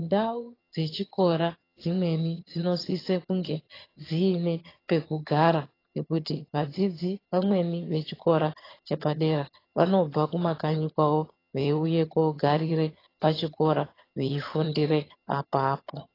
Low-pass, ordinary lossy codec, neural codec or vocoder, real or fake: 5.4 kHz; AAC, 24 kbps; vocoder, 22.05 kHz, 80 mel bands, HiFi-GAN; fake